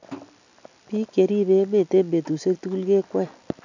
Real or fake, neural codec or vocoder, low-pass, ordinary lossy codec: real; none; 7.2 kHz; none